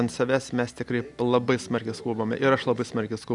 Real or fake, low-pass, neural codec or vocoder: real; 10.8 kHz; none